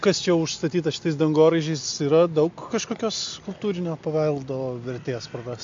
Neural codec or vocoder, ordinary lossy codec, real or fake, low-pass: none; MP3, 48 kbps; real; 7.2 kHz